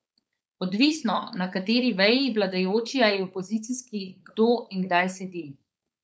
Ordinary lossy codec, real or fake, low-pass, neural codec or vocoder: none; fake; none; codec, 16 kHz, 4.8 kbps, FACodec